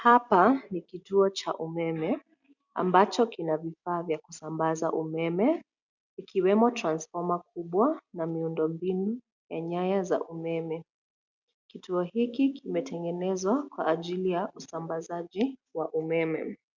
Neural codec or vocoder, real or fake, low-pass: none; real; 7.2 kHz